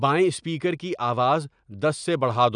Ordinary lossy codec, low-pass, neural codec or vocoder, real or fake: none; 9.9 kHz; none; real